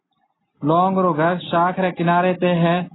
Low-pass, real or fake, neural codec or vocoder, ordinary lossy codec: 7.2 kHz; real; none; AAC, 16 kbps